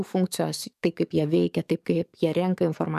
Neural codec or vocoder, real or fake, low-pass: codec, 44.1 kHz, 7.8 kbps, DAC; fake; 14.4 kHz